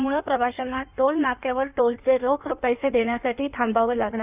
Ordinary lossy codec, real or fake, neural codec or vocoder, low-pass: none; fake; codec, 16 kHz in and 24 kHz out, 1.1 kbps, FireRedTTS-2 codec; 3.6 kHz